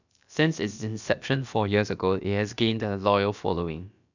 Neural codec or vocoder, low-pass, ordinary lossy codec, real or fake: codec, 16 kHz, about 1 kbps, DyCAST, with the encoder's durations; 7.2 kHz; none; fake